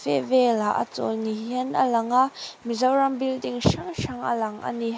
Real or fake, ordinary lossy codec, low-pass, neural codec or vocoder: real; none; none; none